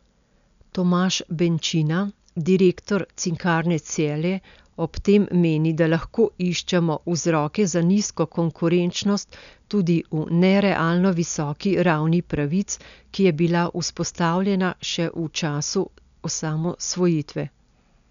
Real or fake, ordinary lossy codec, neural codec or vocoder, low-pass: real; none; none; 7.2 kHz